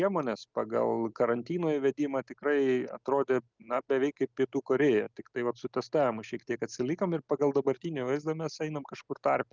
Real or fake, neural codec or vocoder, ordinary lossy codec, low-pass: real; none; Opus, 32 kbps; 7.2 kHz